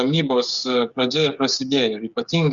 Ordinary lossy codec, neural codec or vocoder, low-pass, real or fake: Opus, 64 kbps; codec, 16 kHz, 8 kbps, FreqCodec, smaller model; 7.2 kHz; fake